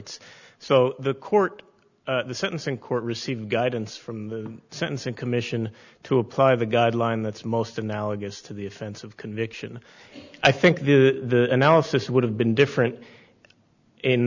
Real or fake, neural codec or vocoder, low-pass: real; none; 7.2 kHz